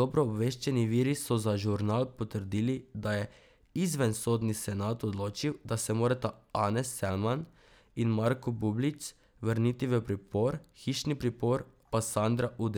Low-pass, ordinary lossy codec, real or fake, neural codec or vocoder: none; none; real; none